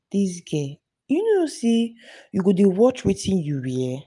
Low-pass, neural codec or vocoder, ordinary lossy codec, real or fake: 10.8 kHz; none; none; real